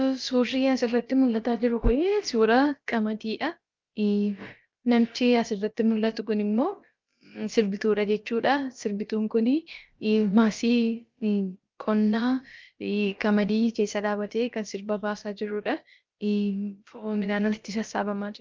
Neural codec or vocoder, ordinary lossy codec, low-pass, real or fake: codec, 16 kHz, about 1 kbps, DyCAST, with the encoder's durations; Opus, 24 kbps; 7.2 kHz; fake